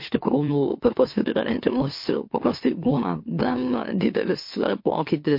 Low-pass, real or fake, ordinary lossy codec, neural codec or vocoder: 5.4 kHz; fake; MP3, 32 kbps; autoencoder, 44.1 kHz, a latent of 192 numbers a frame, MeloTTS